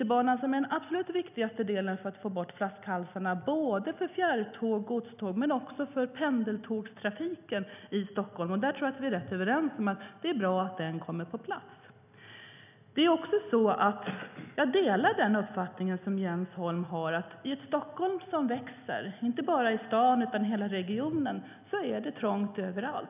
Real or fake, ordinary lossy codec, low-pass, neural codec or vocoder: real; none; 3.6 kHz; none